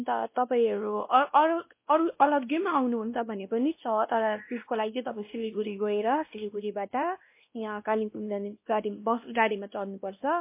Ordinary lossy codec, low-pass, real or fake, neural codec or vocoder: MP3, 24 kbps; 3.6 kHz; fake; codec, 16 kHz, 1 kbps, X-Codec, WavLM features, trained on Multilingual LibriSpeech